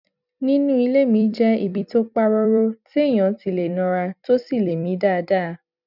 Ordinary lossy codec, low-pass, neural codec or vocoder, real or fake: none; 5.4 kHz; vocoder, 44.1 kHz, 128 mel bands every 256 samples, BigVGAN v2; fake